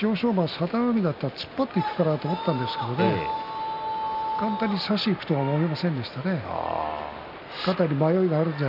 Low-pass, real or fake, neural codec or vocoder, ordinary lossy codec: 5.4 kHz; real; none; none